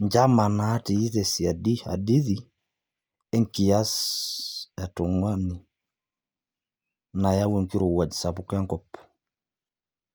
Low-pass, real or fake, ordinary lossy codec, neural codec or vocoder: none; real; none; none